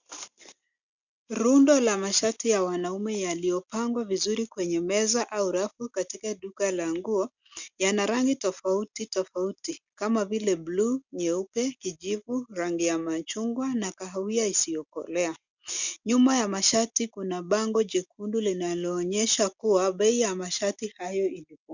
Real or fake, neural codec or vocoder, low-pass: real; none; 7.2 kHz